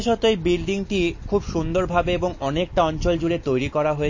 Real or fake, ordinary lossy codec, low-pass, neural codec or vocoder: real; MP3, 32 kbps; 7.2 kHz; none